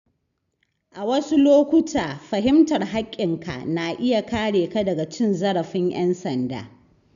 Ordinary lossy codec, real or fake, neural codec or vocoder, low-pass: none; real; none; 7.2 kHz